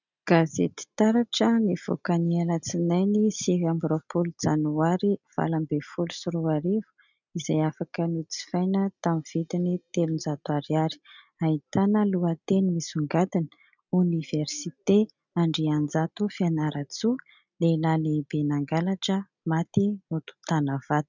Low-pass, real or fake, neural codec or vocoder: 7.2 kHz; real; none